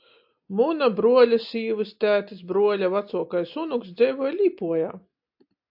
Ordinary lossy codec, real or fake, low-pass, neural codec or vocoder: AAC, 48 kbps; real; 5.4 kHz; none